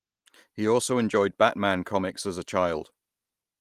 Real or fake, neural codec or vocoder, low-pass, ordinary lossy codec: real; none; 14.4 kHz; Opus, 24 kbps